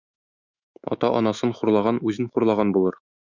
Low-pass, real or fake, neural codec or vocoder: 7.2 kHz; fake; autoencoder, 48 kHz, 128 numbers a frame, DAC-VAE, trained on Japanese speech